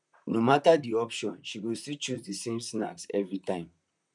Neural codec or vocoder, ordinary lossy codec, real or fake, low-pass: vocoder, 44.1 kHz, 128 mel bands, Pupu-Vocoder; none; fake; 10.8 kHz